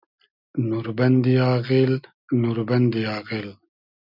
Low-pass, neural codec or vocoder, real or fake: 5.4 kHz; none; real